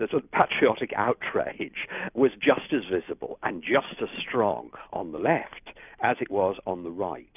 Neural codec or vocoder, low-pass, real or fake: none; 3.6 kHz; real